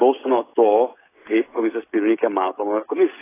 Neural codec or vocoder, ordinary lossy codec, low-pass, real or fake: codec, 16 kHz, 16 kbps, FreqCodec, smaller model; AAC, 16 kbps; 3.6 kHz; fake